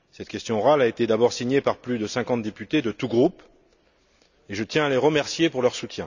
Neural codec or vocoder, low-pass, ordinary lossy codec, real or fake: none; 7.2 kHz; none; real